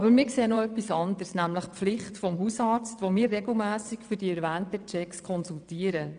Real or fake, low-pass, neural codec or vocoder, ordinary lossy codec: fake; 9.9 kHz; vocoder, 22.05 kHz, 80 mel bands, WaveNeXt; none